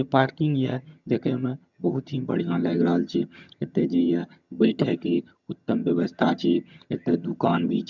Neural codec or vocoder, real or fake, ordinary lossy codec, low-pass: vocoder, 22.05 kHz, 80 mel bands, HiFi-GAN; fake; none; 7.2 kHz